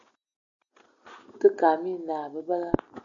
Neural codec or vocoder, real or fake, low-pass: none; real; 7.2 kHz